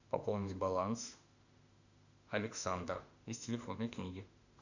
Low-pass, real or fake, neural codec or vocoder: 7.2 kHz; fake; autoencoder, 48 kHz, 32 numbers a frame, DAC-VAE, trained on Japanese speech